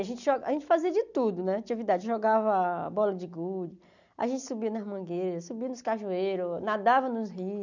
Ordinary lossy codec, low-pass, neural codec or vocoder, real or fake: none; 7.2 kHz; none; real